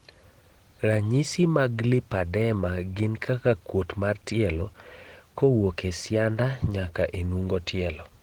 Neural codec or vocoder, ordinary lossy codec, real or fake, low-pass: none; Opus, 16 kbps; real; 19.8 kHz